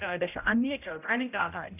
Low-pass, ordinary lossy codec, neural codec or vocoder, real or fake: 3.6 kHz; none; codec, 16 kHz, 0.5 kbps, X-Codec, HuBERT features, trained on general audio; fake